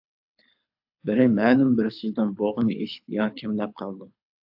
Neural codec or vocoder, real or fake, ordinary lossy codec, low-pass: codec, 24 kHz, 6 kbps, HILCodec; fake; AAC, 48 kbps; 5.4 kHz